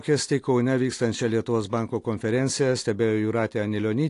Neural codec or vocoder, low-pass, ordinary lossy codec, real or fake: none; 10.8 kHz; AAC, 48 kbps; real